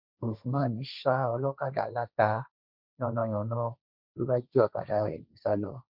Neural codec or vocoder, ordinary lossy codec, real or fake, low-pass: codec, 16 kHz, 1.1 kbps, Voila-Tokenizer; none; fake; 5.4 kHz